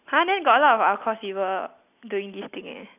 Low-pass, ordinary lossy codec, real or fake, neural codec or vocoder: 3.6 kHz; none; real; none